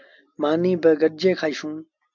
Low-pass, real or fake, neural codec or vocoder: 7.2 kHz; real; none